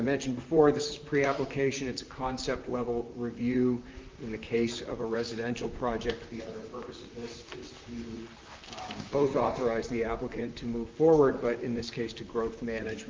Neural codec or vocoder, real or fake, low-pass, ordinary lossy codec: vocoder, 44.1 kHz, 128 mel bands, Pupu-Vocoder; fake; 7.2 kHz; Opus, 24 kbps